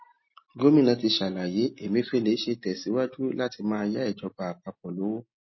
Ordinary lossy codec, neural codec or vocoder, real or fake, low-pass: MP3, 24 kbps; none; real; 7.2 kHz